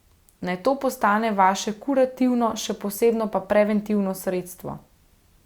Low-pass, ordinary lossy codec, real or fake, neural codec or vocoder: 19.8 kHz; Opus, 64 kbps; real; none